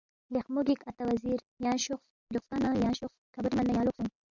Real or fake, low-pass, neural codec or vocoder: real; 7.2 kHz; none